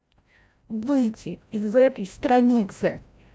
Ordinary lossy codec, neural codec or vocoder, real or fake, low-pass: none; codec, 16 kHz, 0.5 kbps, FreqCodec, larger model; fake; none